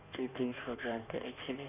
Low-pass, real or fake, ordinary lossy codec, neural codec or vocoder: 3.6 kHz; fake; none; codec, 16 kHz in and 24 kHz out, 1.1 kbps, FireRedTTS-2 codec